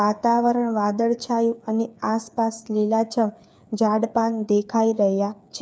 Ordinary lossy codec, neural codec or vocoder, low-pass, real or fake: none; codec, 16 kHz, 8 kbps, FreqCodec, smaller model; none; fake